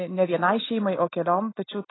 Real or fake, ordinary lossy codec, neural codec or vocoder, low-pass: real; AAC, 16 kbps; none; 7.2 kHz